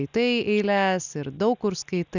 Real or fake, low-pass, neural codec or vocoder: real; 7.2 kHz; none